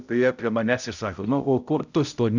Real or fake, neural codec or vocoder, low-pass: fake; codec, 16 kHz, 0.5 kbps, X-Codec, HuBERT features, trained on balanced general audio; 7.2 kHz